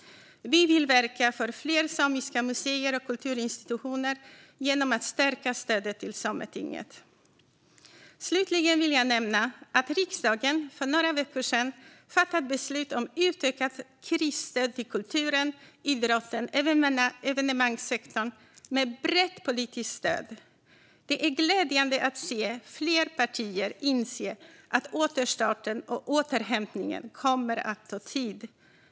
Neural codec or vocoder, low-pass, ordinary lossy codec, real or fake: none; none; none; real